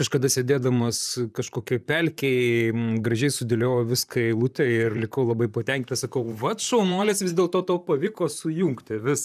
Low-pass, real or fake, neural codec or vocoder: 14.4 kHz; fake; vocoder, 44.1 kHz, 128 mel bands, Pupu-Vocoder